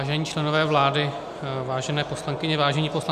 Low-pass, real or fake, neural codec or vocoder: 14.4 kHz; real; none